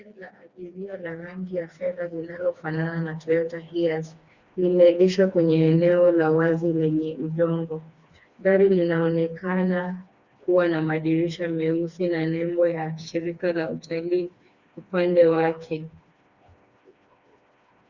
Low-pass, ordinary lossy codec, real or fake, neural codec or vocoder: 7.2 kHz; Opus, 64 kbps; fake; codec, 16 kHz, 2 kbps, FreqCodec, smaller model